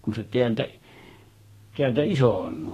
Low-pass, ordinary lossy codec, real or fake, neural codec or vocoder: 14.4 kHz; AAC, 48 kbps; fake; codec, 32 kHz, 1.9 kbps, SNAC